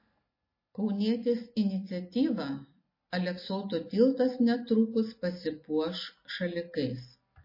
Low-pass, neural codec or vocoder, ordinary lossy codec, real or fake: 5.4 kHz; none; MP3, 24 kbps; real